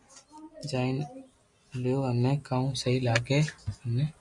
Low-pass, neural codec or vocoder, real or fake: 10.8 kHz; none; real